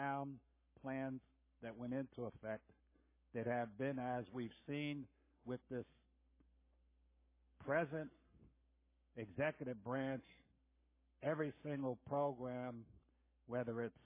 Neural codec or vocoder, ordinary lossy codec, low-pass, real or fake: codec, 44.1 kHz, 7.8 kbps, Pupu-Codec; MP3, 16 kbps; 3.6 kHz; fake